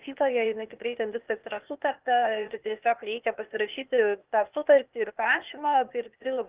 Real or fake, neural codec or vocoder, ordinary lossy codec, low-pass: fake; codec, 16 kHz, 0.8 kbps, ZipCodec; Opus, 32 kbps; 3.6 kHz